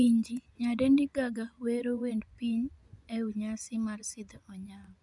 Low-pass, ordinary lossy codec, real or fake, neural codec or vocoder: 10.8 kHz; none; fake; vocoder, 24 kHz, 100 mel bands, Vocos